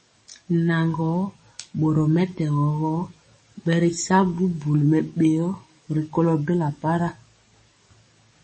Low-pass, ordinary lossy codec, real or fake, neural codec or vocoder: 10.8 kHz; MP3, 32 kbps; fake; codec, 44.1 kHz, 7.8 kbps, DAC